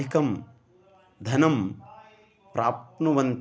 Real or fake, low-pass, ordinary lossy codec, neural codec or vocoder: real; none; none; none